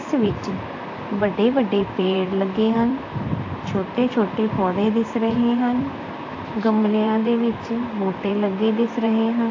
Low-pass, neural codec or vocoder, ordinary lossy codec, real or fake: 7.2 kHz; vocoder, 44.1 kHz, 128 mel bands, Pupu-Vocoder; AAC, 48 kbps; fake